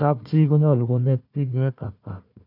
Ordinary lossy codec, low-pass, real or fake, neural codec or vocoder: none; 5.4 kHz; fake; codec, 16 kHz, 1 kbps, FunCodec, trained on Chinese and English, 50 frames a second